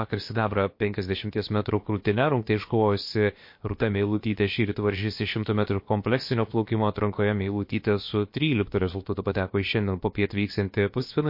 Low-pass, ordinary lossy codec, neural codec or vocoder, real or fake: 5.4 kHz; MP3, 32 kbps; codec, 16 kHz, about 1 kbps, DyCAST, with the encoder's durations; fake